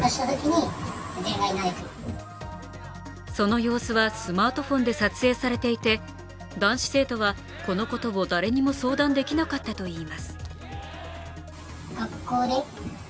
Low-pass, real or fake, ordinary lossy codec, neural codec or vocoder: none; real; none; none